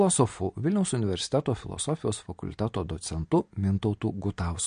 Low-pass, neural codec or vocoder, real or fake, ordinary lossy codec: 9.9 kHz; none; real; MP3, 48 kbps